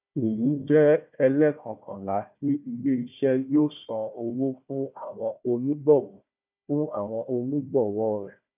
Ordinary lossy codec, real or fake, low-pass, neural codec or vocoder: none; fake; 3.6 kHz; codec, 16 kHz, 1 kbps, FunCodec, trained on Chinese and English, 50 frames a second